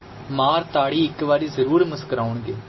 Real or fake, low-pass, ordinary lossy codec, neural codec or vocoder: real; 7.2 kHz; MP3, 24 kbps; none